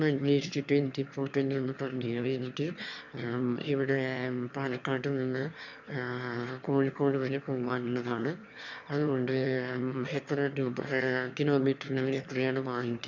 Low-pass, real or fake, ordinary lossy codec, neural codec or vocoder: 7.2 kHz; fake; none; autoencoder, 22.05 kHz, a latent of 192 numbers a frame, VITS, trained on one speaker